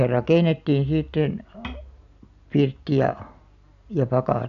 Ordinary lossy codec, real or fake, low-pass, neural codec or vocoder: MP3, 96 kbps; real; 7.2 kHz; none